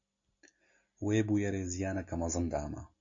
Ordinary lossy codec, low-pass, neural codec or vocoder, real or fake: AAC, 48 kbps; 7.2 kHz; none; real